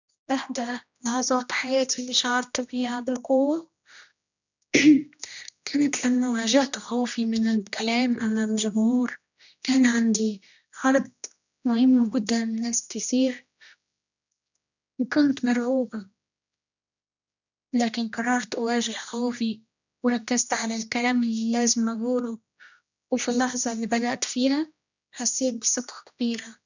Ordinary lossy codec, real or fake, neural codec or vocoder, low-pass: none; fake; codec, 16 kHz, 1 kbps, X-Codec, HuBERT features, trained on general audio; 7.2 kHz